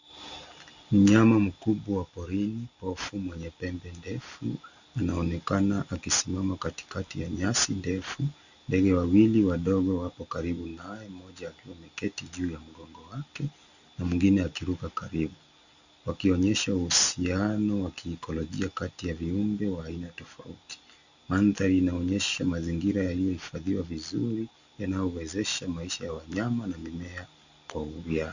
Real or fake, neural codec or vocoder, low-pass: real; none; 7.2 kHz